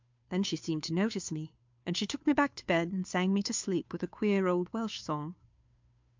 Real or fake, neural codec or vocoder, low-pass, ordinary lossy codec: fake; codec, 16 kHz, 2 kbps, FunCodec, trained on Chinese and English, 25 frames a second; 7.2 kHz; MP3, 64 kbps